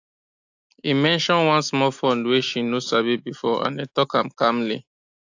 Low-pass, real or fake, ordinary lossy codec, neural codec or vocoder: 7.2 kHz; real; AAC, 48 kbps; none